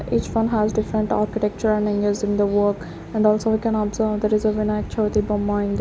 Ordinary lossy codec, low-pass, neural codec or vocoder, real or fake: none; none; none; real